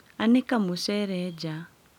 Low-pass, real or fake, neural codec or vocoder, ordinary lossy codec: 19.8 kHz; real; none; none